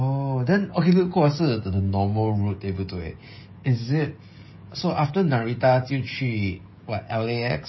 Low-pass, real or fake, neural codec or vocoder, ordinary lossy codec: 7.2 kHz; fake; codec, 16 kHz, 16 kbps, FreqCodec, smaller model; MP3, 24 kbps